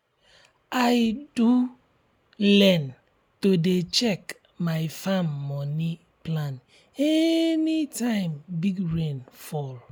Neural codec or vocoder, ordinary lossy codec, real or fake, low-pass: none; none; real; none